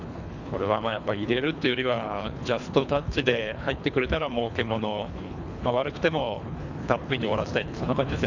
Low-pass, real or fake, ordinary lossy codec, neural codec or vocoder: 7.2 kHz; fake; none; codec, 24 kHz, 3 kbps, HILCodec